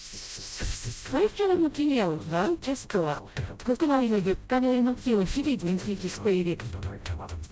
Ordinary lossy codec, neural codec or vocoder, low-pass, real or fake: none; codec, 16 kHz, 0.5 kbps, FreqCodec, smaller model; none; fake